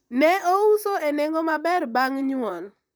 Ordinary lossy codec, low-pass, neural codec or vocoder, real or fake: none; none; vocoder, 44.1 kHz, 128 mel bands, Pupu-Vocoder; fake